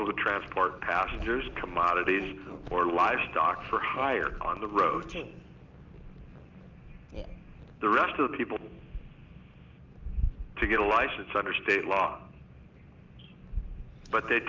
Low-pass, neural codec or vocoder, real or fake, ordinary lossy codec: 7.2 kHz; none; real; Opus, 24 kbps